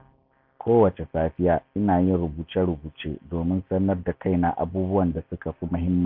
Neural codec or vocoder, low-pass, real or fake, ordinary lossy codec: none; 5.4 kHz; real; AAC, 48 kbps